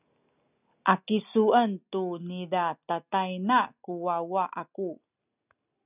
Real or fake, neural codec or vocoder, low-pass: real; none; 3.6 kHz